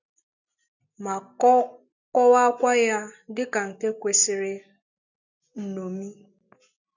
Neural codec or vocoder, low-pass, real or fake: none; 7.2 kHz; real